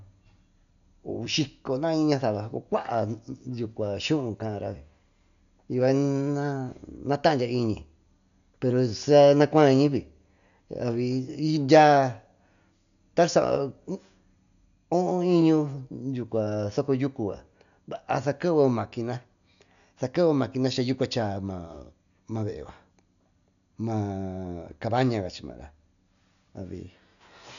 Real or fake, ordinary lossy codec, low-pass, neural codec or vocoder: real; none; 7.2 kHz; none